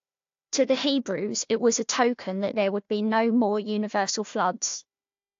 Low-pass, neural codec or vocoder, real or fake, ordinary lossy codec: 7.2 kHz; codec, 16 kHz, 1 kbps, FunCodec, trained on Chinese and English, 50 frames a second; fake; AAC, 48 kbps